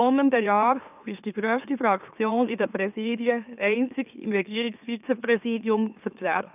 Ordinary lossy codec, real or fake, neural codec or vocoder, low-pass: none; fake; autoencoder, 44.1 kHz, a latent of 192 numbers a frame, MeloTTS; 3.6 kHz